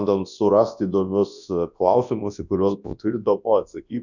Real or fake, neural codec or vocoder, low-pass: fake; codec, 24 kHz, 0.9 kbps, WavTokenizer, large speech release; 7.2 kHz